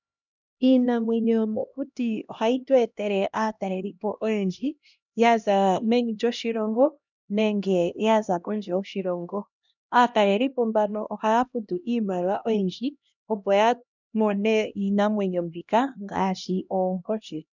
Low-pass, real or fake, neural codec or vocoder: 7.2 kHz; fake; codec, 16 kHz, 1 kbps, X-Codec, HuBERT features, trained on LibriSpeech